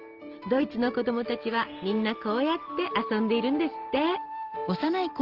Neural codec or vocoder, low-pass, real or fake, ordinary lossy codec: none; 5.4 kHz; real; Opus, 16 kbps